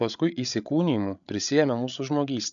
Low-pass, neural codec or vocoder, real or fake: 7.2 kHz; codec, 16 kHz, 8 kbps, FreqCodec, larger model; fake